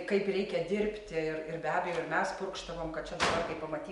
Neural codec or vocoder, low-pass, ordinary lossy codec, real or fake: none; 10.8 kHz; MP3, 64 kbps; real